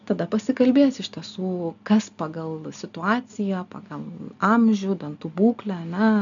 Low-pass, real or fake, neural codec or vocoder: 7.2 kHz; real; none